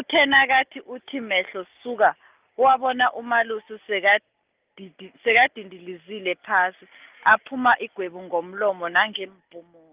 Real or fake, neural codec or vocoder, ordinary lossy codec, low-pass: real; none; Opus, 24 kbps; 3.6 kHz